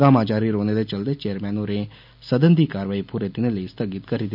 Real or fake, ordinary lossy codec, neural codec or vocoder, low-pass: real; none; none; 5.4 kHz